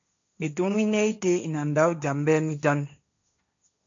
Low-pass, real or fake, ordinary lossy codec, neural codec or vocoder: 7.2 kHz; fake; AAC, 64 kbps; codec, 16 kHz, 1.1 kbps, Voila-Tokenizer